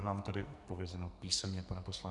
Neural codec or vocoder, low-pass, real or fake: codec, 44.1 kHz, 2.6 kbps, SNAC; 10.8 kHz; fake